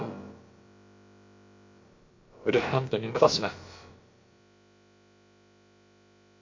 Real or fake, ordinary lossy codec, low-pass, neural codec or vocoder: fake; MP3, 48 kbps; 7.2 kHz; codec, 16 kHz, about 1 kbps, DyCAST, with the encoder's durations